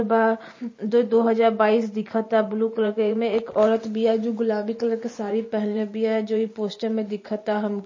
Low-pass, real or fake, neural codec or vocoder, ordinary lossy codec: 7.2 kHz; fake; vocoder, 44.1 kHz, 128 mel bands every 512 samples, BigVGAN v2; MP3, 32 kbps